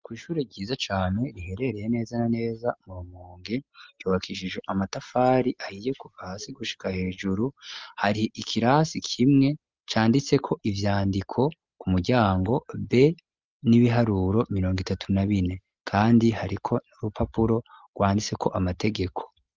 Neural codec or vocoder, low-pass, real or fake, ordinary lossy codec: none; 7.2 kHz; real; Opus, 32 kbps